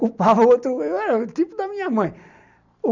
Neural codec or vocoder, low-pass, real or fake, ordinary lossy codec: none; 7.2 kHz; real; none